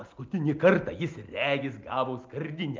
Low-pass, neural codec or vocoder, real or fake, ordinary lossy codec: 7.2 kHz; none; real; Opus, 24 kbps